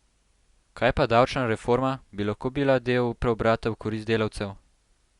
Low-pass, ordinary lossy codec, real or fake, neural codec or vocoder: 10.8 kHz; Opus, 64 kbps; real; none